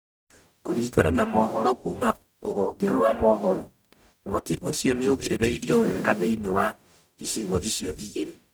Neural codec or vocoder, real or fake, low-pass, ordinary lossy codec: codec, 44.1 kHz, 0.9 kbps, DAC; fake; none; none